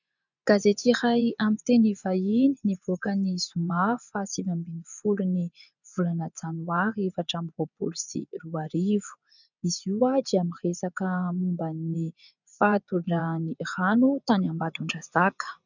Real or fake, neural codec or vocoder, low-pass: fake; vocoder, 24 kHz, 100 mel bands, Vocos; 7.2 kHz